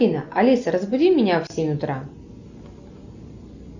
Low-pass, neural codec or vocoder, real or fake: 7.2 kHz; none; real